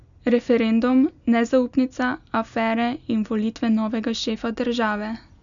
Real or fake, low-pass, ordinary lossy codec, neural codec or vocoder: real; 7.2 kHz; none; none